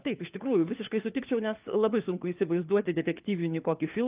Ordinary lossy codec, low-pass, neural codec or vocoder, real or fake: Opus, 24 kbps; 3.6 kHz; codec, 16 kHz, 4 kbps, FunCodec, trained on LibriTTS, 50 frames a second; fake